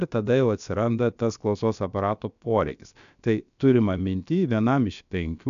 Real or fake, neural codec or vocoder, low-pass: fake; codec, 16 kHz, about 1 kbps, DyCAST, with the encoder's durations; 7.2 kHz